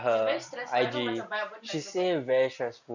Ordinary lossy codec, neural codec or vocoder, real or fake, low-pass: none; none; real; 7.2 kHz